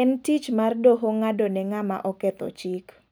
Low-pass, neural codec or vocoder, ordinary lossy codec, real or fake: none; none; none; real